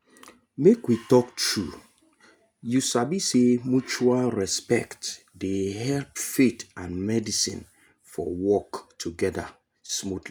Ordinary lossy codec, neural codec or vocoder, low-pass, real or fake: none; none; none; real